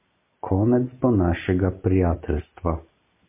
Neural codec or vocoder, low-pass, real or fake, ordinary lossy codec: none; 3.6 kHz; real; MP3, 24 kbps